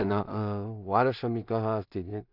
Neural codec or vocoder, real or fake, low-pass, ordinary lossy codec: codec, 16 kHz in and 24 kHz out, 0.4 kbps, LongCat-Audio-Codec, two codebook decoder; fake; 5.4 kHz; none